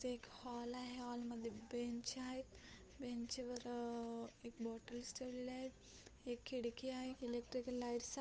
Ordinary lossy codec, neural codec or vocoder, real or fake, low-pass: none; codec, 16 kHz, 8 kbps, FunCodec, trained on Chinese and English, 25 frames a second; fake; none